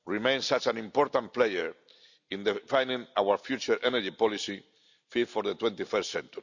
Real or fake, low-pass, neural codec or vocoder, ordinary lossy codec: real; 7.2 kHz; none; none